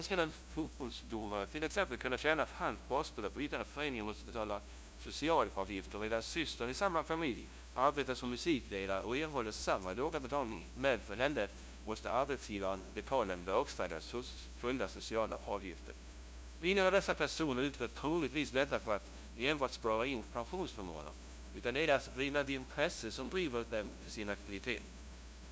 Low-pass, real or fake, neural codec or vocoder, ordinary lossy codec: none; fake; codec, 16 kHz, 0.5 kbps, FunCodec, trained on LibriTTS, 25 frames a second; none